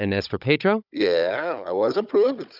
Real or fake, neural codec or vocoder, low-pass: fake; codec, 16 kHz, 16 kbps, FunCodec, trained on Chinese and English, 50 frames a second; 5.4 kHz